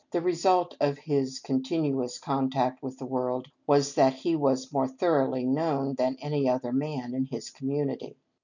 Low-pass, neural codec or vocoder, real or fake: 7.2 kHz; none; real